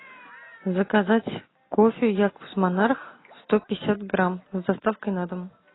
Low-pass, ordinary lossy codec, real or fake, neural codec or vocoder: 7.2 kHz; AAC, 16 kbps; real; none